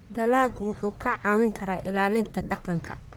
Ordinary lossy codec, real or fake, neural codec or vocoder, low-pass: none; fake; codec, 44.1 kHz, 1.7 kbps, Pupu-Codec; none